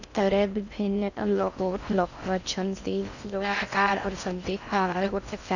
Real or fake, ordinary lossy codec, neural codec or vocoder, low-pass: fake; none; codec, 16 kHz in and 24 kHz out, 0.6 kbps, FocalCodec, streaming, 4096 codes; 7.2 kHz